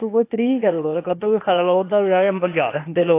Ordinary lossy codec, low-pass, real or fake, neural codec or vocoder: AAC, 24 kbps; 3.6 kHz; fake; codec, 16 kHz, 0.8 kbps, ZipCodec